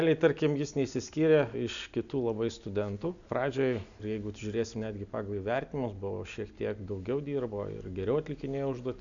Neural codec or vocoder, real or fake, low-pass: none; real; 7.2 kHz